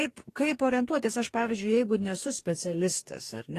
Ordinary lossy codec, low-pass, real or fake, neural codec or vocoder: AAC, 48 kbps; 14.4 kHz; fake; codec, 44.1 kHz, 2.6 kbps, DAC